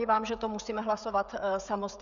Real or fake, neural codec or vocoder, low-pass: fake; codec, 16 kHz, 16 kbps, FreqCodec, smaller model; 7.2 kHz